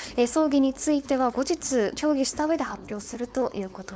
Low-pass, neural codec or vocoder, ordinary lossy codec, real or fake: none; codec, 16 kHz, 4.8 kbps, FACodec; none; fake